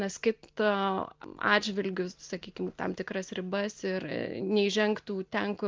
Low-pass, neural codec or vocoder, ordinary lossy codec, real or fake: 7.2 kHz; none; Opus, 24 kbps; real